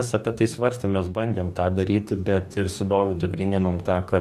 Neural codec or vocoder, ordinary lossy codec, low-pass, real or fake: codec, 44.1 kHz, 2.6 kbps, DAC; AAC, 96 kbps; 14.4 kHz; fake